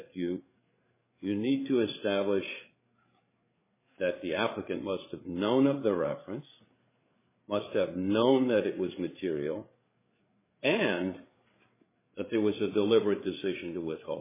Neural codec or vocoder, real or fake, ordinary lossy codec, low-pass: codec, 16 kHz, 8 kbps, FreqCodec, larger model; fake; MP3, 16 kbps; 3.6 kHz